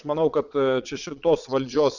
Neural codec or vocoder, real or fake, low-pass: vocoder, 22.05 kHz, 80 mel bands, Vocos; fake; 7.2 kHz